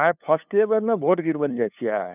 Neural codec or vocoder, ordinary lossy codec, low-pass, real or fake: codec, 16 kHz, 2 kbps, FunCodec, trained on LibriTTS, 25 frames a second; none; 3.6 kHz; fake